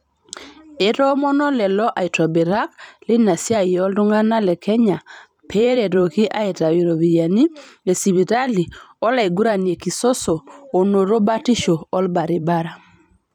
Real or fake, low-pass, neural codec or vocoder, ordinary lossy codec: fake; 19.8 kHz; vocoder, 44.1 kHz, 128 mel bands every 512 samples, BigVGAN v2; none